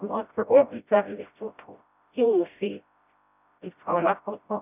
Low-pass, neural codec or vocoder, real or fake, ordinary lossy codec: 3.6 kHz; codec, 16 kHz, 0.5 kbps, FreqCodec, smaller model; fake; none